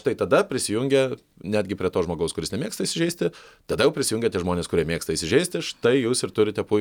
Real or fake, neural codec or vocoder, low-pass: real; none; 19.8 kHz